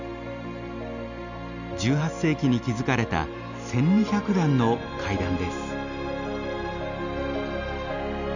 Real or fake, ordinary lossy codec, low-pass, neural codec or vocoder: real; none; 7.2 kHz; none